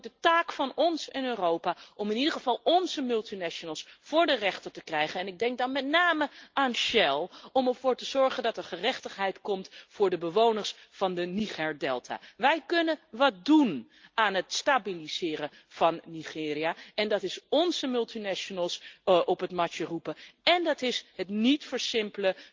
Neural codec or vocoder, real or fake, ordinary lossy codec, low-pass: none; real; Opus, 24 kbps; 7.2 kHz